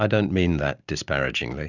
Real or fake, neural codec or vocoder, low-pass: real; none; 7.2 kHz